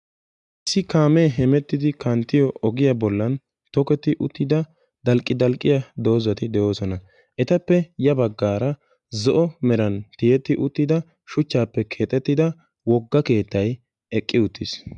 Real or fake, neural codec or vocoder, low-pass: real; none; 10.8 kHz